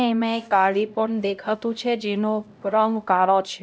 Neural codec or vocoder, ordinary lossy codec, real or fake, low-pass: codec, 16 kHz, 0.5 kbps, X-Codec, HuBERT features, trained on LibriSpeech; none; fake; none